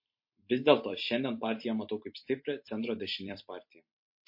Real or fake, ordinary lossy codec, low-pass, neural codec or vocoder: fake; MP3, 32 kbps; 5.4 kHz; vocoder, 44.1 kHz, 128 mel bands every 256 samples, BigVGAN v2